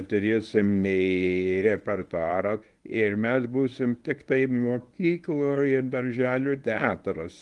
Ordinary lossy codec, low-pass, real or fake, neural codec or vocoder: Opus, 24 kbps; 10.8 kHz; fake; codec, 24 kHz, 0.9 kbps, WavTokenizer, medium speech release version 1